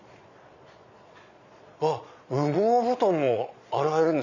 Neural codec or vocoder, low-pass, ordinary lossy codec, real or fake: vocoder, 22.05 kHz, 80 mel bands, Vocos; 7.2 kHz; none; fake